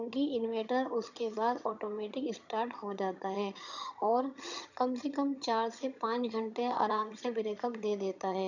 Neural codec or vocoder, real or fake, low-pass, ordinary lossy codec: vocoder, 22.05 kHz, 80 mel bands, HiFi-GAN; fake; 7.2 kHz; none